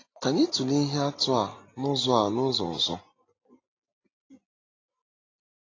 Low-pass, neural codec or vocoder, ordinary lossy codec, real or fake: 7.2 kHz; none; AAC, 32 kbps; real